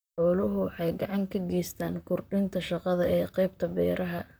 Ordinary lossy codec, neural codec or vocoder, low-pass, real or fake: none; vocoder, 44.1 kHz, 128 mel bands, Pupu-Vocoder; none; fake